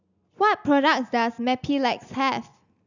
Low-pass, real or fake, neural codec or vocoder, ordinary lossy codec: 7.2 kHz; real; none; none